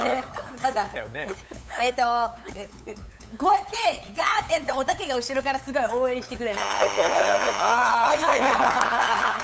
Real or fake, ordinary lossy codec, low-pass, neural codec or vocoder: fake; none; none; codec, 16 kHz, 8 kbps, FunCodec, trained on LibriTTS, 25 frames a second